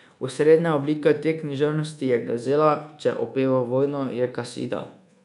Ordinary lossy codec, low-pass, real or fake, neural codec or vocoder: none; 10.8 kHz; fake; codec, 24 kHz, 1.2 kbps, DualCodec